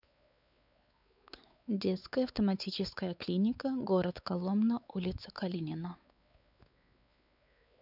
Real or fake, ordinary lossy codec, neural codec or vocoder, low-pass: fake; none; codec, 16 kHz, 4 kbps, X-Codec, WavLM features, trained on Multilingual LibriSpeech; 5.4 kHz